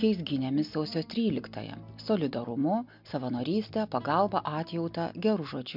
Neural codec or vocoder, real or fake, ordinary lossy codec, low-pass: none; real; MP3, 48 kbps; 5.4 kHz